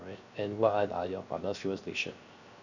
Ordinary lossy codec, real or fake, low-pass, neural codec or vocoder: none; fake; 7.2 kHz; codec, 16 kHz, 0.3 kbps, FocalCodec